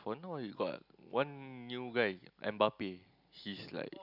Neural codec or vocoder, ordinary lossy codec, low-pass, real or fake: none; none; 5.4 kHz; real